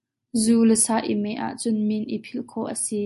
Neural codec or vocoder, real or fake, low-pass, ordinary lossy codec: none; real; 14.4 kHz; MP3, 48 kbps